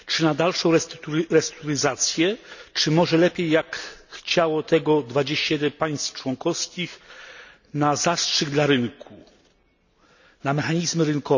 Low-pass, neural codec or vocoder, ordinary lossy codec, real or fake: 7.2 kHz; none; none; real